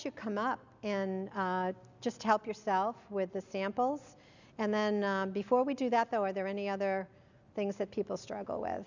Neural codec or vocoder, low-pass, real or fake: none; 7.2 kHz; real